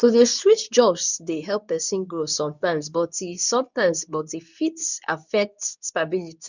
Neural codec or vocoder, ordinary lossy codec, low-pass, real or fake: codec, 24 kHz, 0.9 kbps, WavTokenizer, medium speech release version 2; none; 7.2 kHz; fake